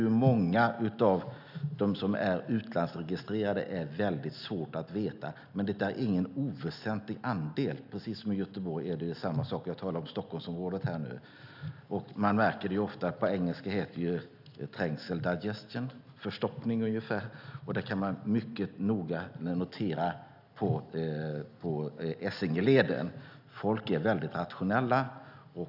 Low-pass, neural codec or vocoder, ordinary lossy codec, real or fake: 5.4 kHz; none; none; real